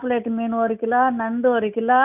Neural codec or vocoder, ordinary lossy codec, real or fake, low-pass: none; none; real; 3.6 kHz